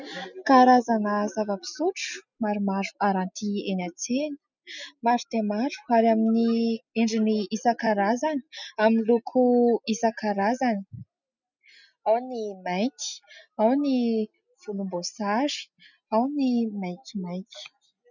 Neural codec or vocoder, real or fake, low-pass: none; real; 7.2 kHz